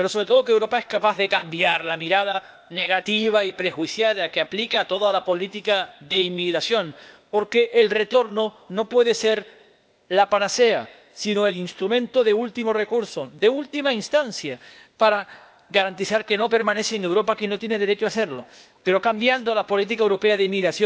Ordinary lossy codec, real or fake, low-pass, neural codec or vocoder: none; fake; none; codec, 16 kHz, 0.8 kbps, ZipCodec